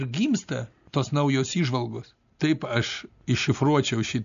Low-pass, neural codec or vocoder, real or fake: 7.2 kHz; none; real